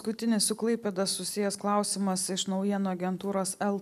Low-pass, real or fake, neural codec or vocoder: 14.4 kHz; real; none